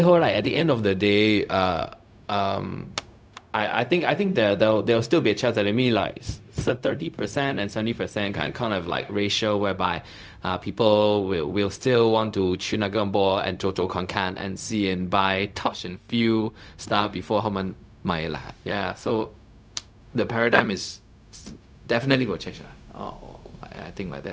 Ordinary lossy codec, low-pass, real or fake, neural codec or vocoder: none; none; fake; codec, 16 kHz, 0.4 kbps, LongCat-Audio-Codec